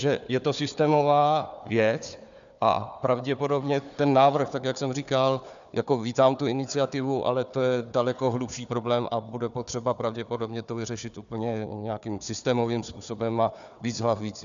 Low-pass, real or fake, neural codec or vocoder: 7.2 kHz; fake; codec, 16 kHz, 4 kbps, FunCodec, trained on Chinese and English, 50 frames a second